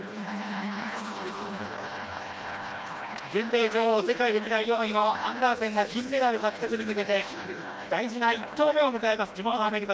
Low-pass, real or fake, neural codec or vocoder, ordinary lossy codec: none; fake; codec, 16 kHz, 1 kbps, FreqCodec, smaller model; none